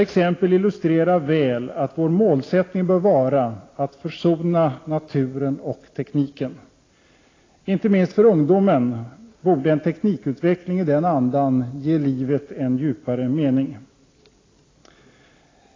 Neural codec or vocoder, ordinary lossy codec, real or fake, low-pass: none; AAC, 32 kbps; real; 7.2 kHz